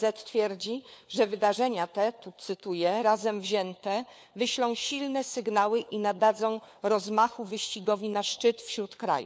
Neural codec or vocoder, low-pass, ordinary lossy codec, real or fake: codec, 16 kHz, 4 kbps, FunCodec, trained on LibriTTS, 50 frames a second; none; none; fake